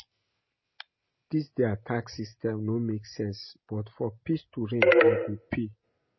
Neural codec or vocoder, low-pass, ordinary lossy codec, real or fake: none; 7.2 kHz; MP3, 24 kbps; real